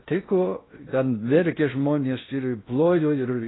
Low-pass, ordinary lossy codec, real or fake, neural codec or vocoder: 7.2 kHz; AAC, 16 kbps; fake; codec, 16 kHz in and 24 kHz out, 0.6 kbps, FocalCodec, streaming, 4096 codes